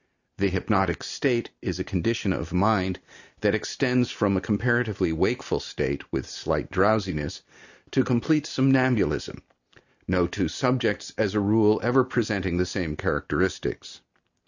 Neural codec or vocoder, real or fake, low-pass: none; real; 7.2 kHz